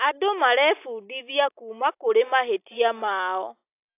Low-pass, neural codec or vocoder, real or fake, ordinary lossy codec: 3.6 kHz; none; real; AAC, 32 kbps